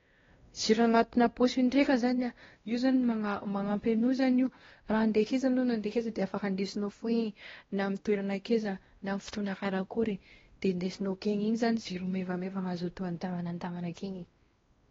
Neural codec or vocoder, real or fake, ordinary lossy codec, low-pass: codec, 16 kHz, 1 kbps, X-Codec, WavLM features, trained on Multilingual LibriSpeech; fake; AAC, 24 kbps; 7.2 kHz